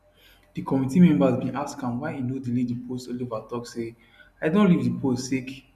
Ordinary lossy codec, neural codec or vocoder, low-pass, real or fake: none; none; 14.4 kHz; real